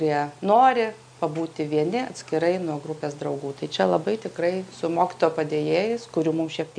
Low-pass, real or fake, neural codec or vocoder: 9.9 kHz; real; none